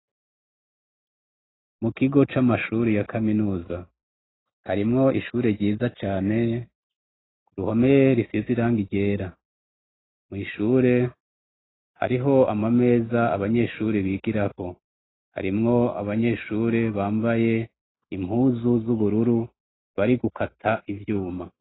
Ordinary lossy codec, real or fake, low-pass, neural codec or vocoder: AAC, 16 kbps; real; 7.2 kHz; none